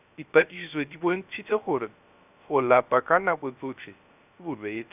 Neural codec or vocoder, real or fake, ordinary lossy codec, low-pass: codec, 16 kHz, 0.3 kbps, FocalCodec; fake; none; 3.6 kHz